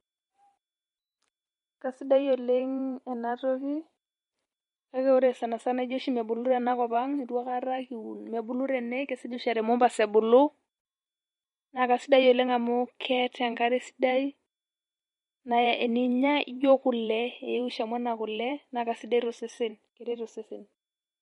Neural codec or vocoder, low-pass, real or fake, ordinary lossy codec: vocoder, 44.1 kHz, 128 mel bands every 512 samples, BigVGAN v2; 19.8 kHz; fake; MP3, 48 kbps